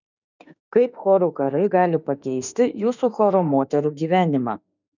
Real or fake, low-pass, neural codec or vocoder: fake; 7.2 kHz; autoencoder, 48 kHz, 32 numbers a frame, DAC-VAE, trained on Japanese speech